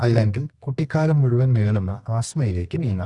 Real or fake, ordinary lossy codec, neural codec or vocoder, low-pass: fake; Opus, 64 kbps; codec, 24 kHz, 0.9 kbps, WavTokenizer, medium music audio release; 10.8 kHz